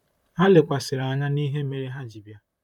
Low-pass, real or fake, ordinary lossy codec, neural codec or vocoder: 19.8 kHz; fake; none; vocoder, 44.1 kHz, 128 mel bands, Pupu-Vocoder